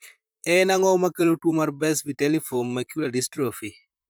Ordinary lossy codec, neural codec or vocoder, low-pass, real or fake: none; vocoder, 44.1 kHz, 128 mel bands, Pupu-Vocoder; none; fake